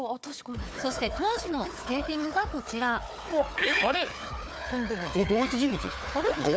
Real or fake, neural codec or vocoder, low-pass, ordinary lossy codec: fake; codec, 16 kHz, 4 kbps, FunCodec, trained on Chinese and English, 50 frames a second; none; none